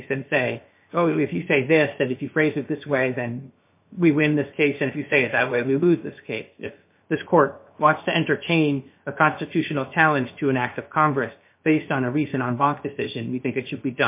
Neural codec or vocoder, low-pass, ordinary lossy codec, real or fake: codec, 16 kHz, 0.7 kbps, FocalCodec; 3.6 kHz; MP3, 24 kbps; fake